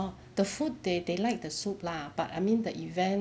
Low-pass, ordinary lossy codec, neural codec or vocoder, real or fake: none; none; none; real